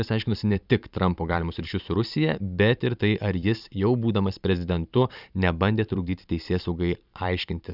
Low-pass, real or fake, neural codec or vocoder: 5.4 kHz; fake; vocoder, 44.1 kHz, 128 mel bands every 512 samples, BigVGAN v2